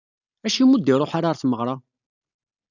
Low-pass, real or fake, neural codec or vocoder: 7.2 kHz; real; none